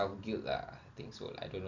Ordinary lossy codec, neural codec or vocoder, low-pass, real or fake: none; none; 7.2 kHz; real